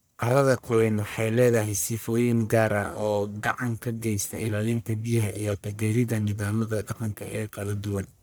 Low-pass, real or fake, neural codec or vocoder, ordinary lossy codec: none; fake; codec, 44.1 kHz, 1.7 kbps, Pupu-Codec; none